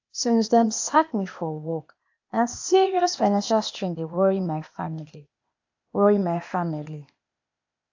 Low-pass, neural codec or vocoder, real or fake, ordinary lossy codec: 7.2 kHz; codec, 16 kHz, 0.8 kbps, ZipCodec; fake; AAC, 48 kbps